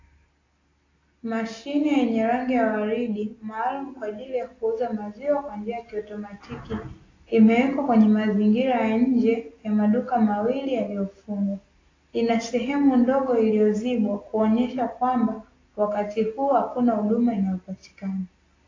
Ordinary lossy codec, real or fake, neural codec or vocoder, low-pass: AAC, 32 kbps; real; none; 7.2 kHz